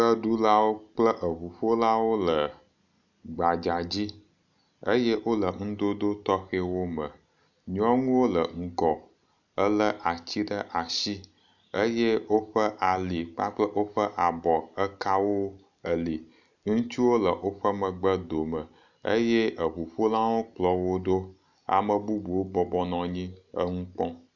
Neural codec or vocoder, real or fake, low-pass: none; real; 7.2 kHz